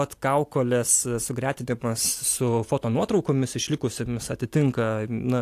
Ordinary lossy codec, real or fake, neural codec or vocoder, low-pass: AAC, 64 kbps; real; none; 14.4 kHz